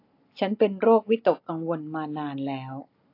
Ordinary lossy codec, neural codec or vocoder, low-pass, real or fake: AAC, 32 kbps; none; 5.4 kHz; real